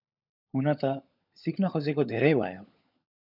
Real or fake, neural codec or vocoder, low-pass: fake; codec, 16 kHz, 16 kbps, FunCodec, trained on LibriTTS, 50 frames a second; 5.4 kHz